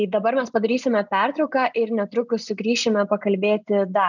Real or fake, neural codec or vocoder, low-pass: real; none; 7.2 kHz